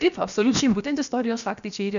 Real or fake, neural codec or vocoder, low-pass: fake; codec, 16 kHz, about 1 kbps, DyCAST, with the encoder's durations; 7.2 kHz